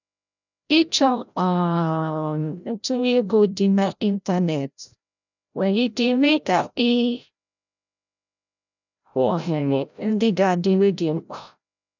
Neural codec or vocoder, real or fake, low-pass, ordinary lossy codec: codec, 16 kHz, 0.5 kbps, FreqCodec, larger model; fake; 7.2 kHz; none